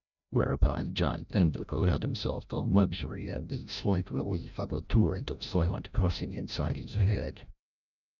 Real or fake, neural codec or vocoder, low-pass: fake; codec, 16 kHz, 0.5 kbps, FreqCodec, larger model; 7.2 kHz